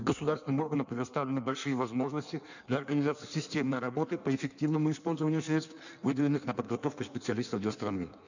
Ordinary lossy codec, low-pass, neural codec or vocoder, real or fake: none; 7.2 kHz; codec, 16 kHz in and 24 kHz out, 1.1 kbps, FireRedTTS-2 codec; fake